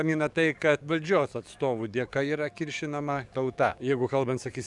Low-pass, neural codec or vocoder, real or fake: 10.8 kHz; codec, 44.1 kHz, 7.8 kbps, DAC; fake